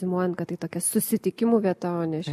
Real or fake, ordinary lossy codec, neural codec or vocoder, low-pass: real; MP3, 64 kbps; none; 14.4 kHz